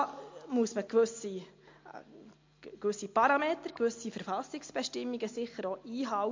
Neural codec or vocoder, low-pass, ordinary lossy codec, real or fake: none; 7.2 kHz; MP3, 48 kbps; real